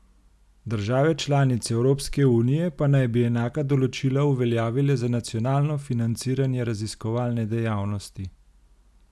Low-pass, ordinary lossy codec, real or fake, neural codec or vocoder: none; none; real; none